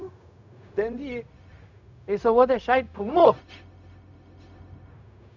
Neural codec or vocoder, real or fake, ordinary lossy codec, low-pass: codec, 16 kHz, 0.4 kbps, LongCat-Audio-Codec; fake; none; 7.2 kHz